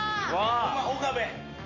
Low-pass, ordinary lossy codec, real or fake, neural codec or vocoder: 7.2 kHz; AAC, 32 kbps; real; none